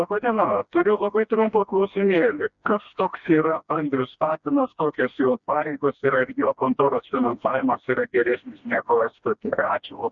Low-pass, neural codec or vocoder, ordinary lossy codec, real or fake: 7.2 kHz; codec, 16 kHz, 1 kbps, FreqCodec, smaller model; Opus, 64 kbps; fake